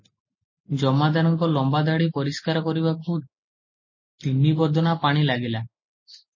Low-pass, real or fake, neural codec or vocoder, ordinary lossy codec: 7.2 kHz; real; none; MP3, 32 kbps